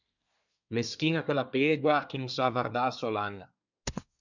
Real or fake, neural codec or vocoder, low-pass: fake; codec, 24 kHz, 1 kbps, SNAC; 7.2 kHz